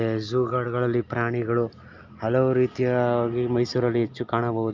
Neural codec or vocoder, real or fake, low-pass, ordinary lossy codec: none; real; 7.2 kHz; Opus, 24 kbps